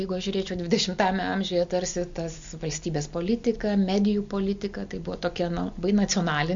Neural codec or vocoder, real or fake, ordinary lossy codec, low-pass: none; real; MP3, 48 kbps; 7.2 kHz